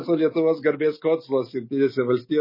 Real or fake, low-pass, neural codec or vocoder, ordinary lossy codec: real; 5.4 kHz; none; MP3, 24 kbps